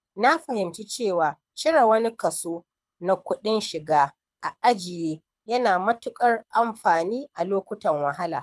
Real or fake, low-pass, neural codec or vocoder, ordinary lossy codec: fake; none; codec, 24 kHz, 6 kbps, HILCodec; none